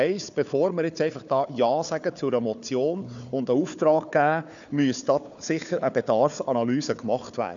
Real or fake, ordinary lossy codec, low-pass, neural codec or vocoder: fake; none; 7.2 kHz; codec, 16 kHz, 4 kbps, FunCodec, trained on Chinese and English, 50 frames a second